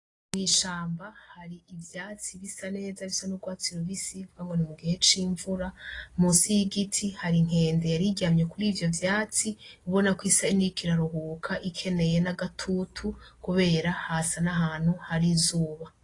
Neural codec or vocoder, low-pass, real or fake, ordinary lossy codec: none; 10.8 kHz; real; AAC, 32 kbps